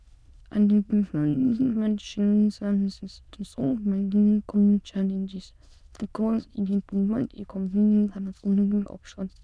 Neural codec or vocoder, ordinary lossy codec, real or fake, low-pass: autoencoder, 22.05 kHz, a latent of 192 numbers a frame, VITS, trained on many speakers; none; fake; none